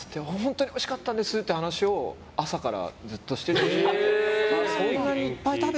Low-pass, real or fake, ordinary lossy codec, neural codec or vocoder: none; real; none; none